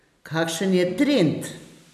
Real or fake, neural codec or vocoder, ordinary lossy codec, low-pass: real; none; none; 14.4 kHz